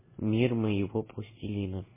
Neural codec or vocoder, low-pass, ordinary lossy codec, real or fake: none; 3.6 kHz; MP3, 16 kbps; real